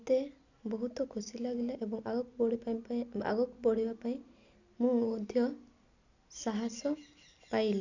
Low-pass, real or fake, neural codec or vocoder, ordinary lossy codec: 7.2 kHz; real; none; none